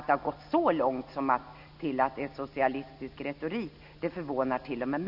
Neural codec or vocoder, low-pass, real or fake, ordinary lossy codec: none; 5.4 kHz; real; none